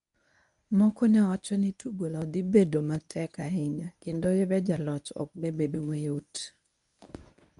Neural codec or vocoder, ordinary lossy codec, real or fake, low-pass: codec, 24 kHz, 0.9 kbps, WavTokenizer, medium speech release version 1; none; fake; 10.8 kHz